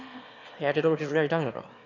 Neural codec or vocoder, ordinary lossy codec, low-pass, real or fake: autoencoder, 22.05 kHz, a latent of 192 numbers a frame, VITS, trained on one speaker; none; 7.2 kHz; fake